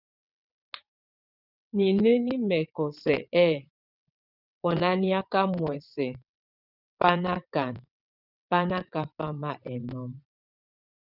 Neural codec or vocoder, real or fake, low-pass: vocoder, 22.05 kHz, 80 mel bands, WaveNeXt; fake; 5.4 kHz